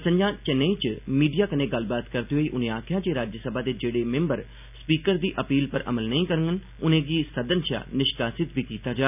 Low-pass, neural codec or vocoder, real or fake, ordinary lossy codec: 3.6 kHz; none; real; none